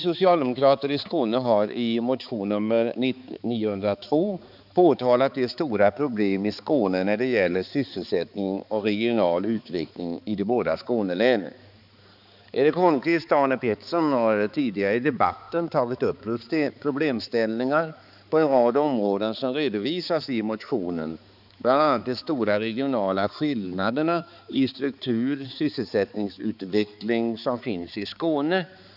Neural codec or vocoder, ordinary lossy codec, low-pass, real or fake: codec, 16 kHz, 4 kbps, X-Codec, HuBERT features, trained on balanced general audio; none; 5.4 kHz; fake